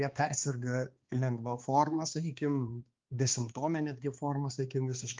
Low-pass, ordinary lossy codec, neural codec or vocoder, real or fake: 7.2 kHz; Opus, 32 kbps; codec, 16 kHz, 2 kbps, X-Codec, HuBERT features, trained on balanced general audio; fake